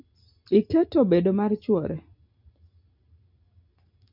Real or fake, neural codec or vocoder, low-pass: real; none; 5.4 kHz